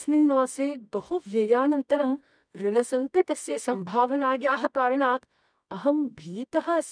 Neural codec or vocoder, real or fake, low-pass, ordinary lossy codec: codec, 24 kHz, 0.9 kbps, WavTokenizer, medium music audio release; fake; 9.9 kHz; none